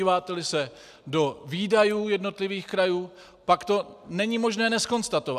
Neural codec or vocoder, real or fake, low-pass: none; real; 14.4 kHz